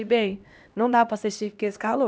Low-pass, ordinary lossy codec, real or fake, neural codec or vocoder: none; none; fake; codec, 16 kHz, 1 kbps, X-Codec, HuBERT features, trained on LibriSpeech